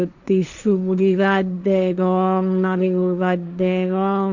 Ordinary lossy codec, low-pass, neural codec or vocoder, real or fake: none; 7.2 kHz; codec, 16 kHz, 1.1 kbps, Voila-Tokenizer; fake